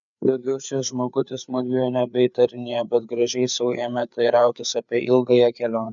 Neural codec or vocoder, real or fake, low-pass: codec, 16 kHz, 4 kbps, FreqCodec, larger model; fake; 7.2 kHz